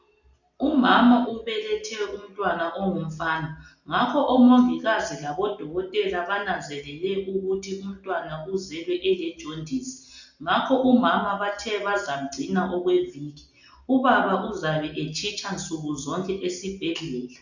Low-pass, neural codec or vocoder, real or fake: 7.2 kHz; none; real